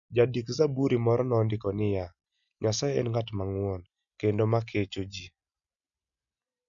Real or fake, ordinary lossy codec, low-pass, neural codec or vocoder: real; none; 7.2 kHz; none